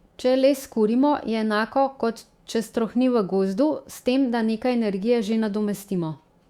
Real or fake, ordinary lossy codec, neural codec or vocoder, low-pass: fake; Opus, 64 kbps; autoencoder, 48 kHz, 128 numbers a frame, DAC-VAE, trained on Japanese speech; 19.8 kHz